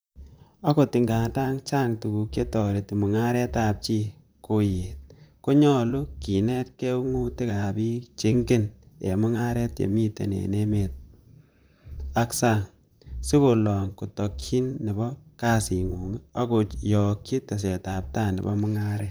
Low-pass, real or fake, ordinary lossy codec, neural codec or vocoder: none; fake; none; vocoder, 44.1 kHz, 128 mel bands, Pupu-Vocoder